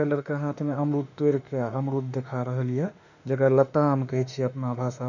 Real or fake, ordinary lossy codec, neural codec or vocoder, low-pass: fake; none; autoencoder, 48 kHz, 32 numbers a frame, DAC-VAE, trained on Japanese speech; 7.2 kHz